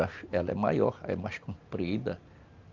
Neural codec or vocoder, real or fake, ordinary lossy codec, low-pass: none; real; Opus, 24 kbps; 7.2 kHz